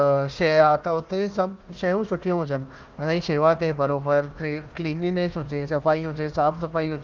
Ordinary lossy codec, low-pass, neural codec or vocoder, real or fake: Opus, 24 kbps; 7.2 kHz; codec, 16 kHz, 1 kbps, FunCodec, trained on Chinese and English, 50 frames a second; fake